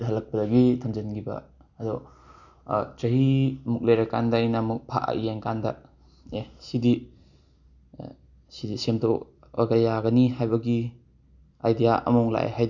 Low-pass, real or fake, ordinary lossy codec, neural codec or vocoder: 7.2 kHz; real; none; none